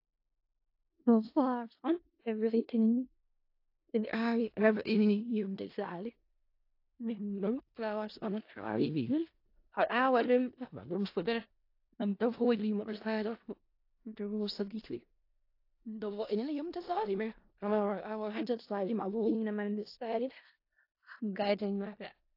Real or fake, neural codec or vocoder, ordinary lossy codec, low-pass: fake; codec, 16 kHz in and 24 kHz out, 0.4 kbps, LongCat-Audio-Codec, four codebook decoder; AAC, 32 kbps; 5.4 kHz